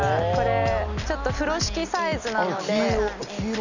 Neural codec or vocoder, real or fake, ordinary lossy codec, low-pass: none; real; none; 7.2 kHz